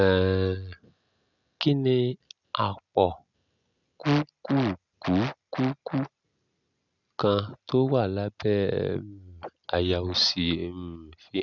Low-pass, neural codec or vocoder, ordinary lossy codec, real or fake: 7.2 kHz; none; none; real